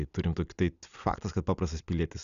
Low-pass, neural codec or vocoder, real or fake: 7.2 kHz; none; real